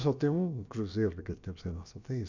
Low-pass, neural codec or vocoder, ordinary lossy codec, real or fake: 7.2 kHz; codec, 16 kHz, about 1 kbps, DyCAST, with the encoder's durations; none; fake